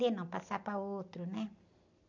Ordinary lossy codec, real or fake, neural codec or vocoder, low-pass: none; real; none; 7.2 kHz